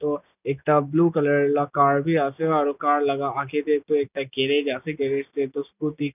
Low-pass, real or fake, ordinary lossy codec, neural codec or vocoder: 3.6 kHz; real; none; none